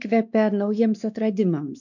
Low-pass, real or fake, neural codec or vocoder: 7.2 kHz; fake; codec, 16 kHz, 2 kbps, X-Codec, WavLM features, trained on Multilingual LibriSpeech